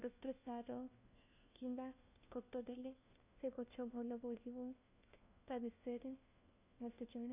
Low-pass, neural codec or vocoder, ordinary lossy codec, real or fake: 3.6 kHz; codec, 16 kHz, 1 kbps, FunCodec, trained on LibriTTS, 50 frames a second; none; fake